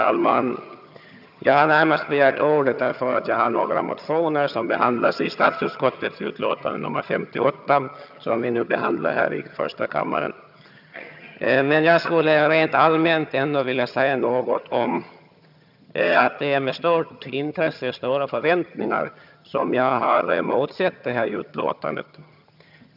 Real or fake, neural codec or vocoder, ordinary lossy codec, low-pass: fake; vocoder, 22.05 kHz, 80 mel bands, HiFi-GAN; none; 5.4 kHz